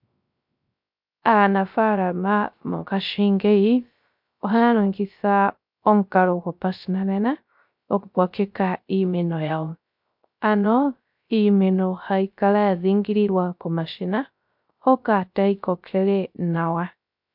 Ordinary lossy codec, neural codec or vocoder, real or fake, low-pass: MP3, 48 kbps; codec, 16 kHz, 0.3 kbps, FocalCodec; fake; 5.4 kHz